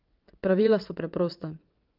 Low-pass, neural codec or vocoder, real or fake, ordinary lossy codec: 5.4 kHz; vocoder, 22.05 kHz, 80 mel bands, WaveNeXt; fake; Opus, 24 kbps